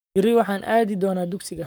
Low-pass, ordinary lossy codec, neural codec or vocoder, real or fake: none; none; codec, 44.1 kHz, 7.8 kbps, Pupu-Codec; fake